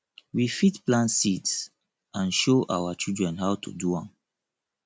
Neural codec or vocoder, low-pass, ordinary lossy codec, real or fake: none; none; none; real